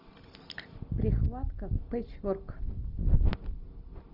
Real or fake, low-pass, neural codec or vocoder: real; 5.4 kHz; none